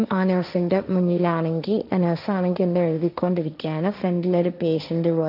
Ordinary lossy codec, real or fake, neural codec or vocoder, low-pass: none; fake; codec, 16 kHz, 1.1 kbps, Voila-Tokenizer; 5.4 kHz